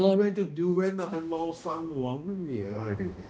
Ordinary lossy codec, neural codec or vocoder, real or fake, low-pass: none; codec, 16 kHz, 1 kbps, X-Codec, HuBERT features, trained on balanced general audio; fake; none